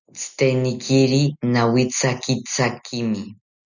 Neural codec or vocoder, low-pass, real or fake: none; 7.2 kHz; real